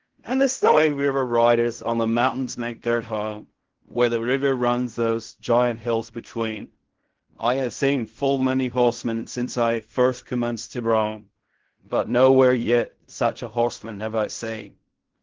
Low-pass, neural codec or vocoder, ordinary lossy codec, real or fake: 7.2 kHz; codec, 16 kHz in and 24 kHz out, 0.4 kbps, LongCat-Audio-Codec, fine tuned four codebook decoder; Opus, 16 kbps; fake